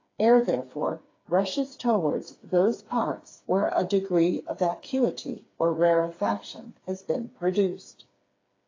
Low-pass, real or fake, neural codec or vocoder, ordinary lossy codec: 7.2 kHz; fake; codec, 16 kHz, 4 kbps, FreqCodec, smaller model; AAC, 32 kbps